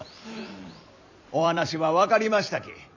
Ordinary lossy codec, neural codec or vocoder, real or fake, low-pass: none; vocoder, 44.1 kHz, 128 mel bands every 256 samples, BigVGAN v2; fake; 7.2 kHz